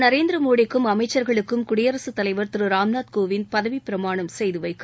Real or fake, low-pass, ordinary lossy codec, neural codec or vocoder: real; none; none; none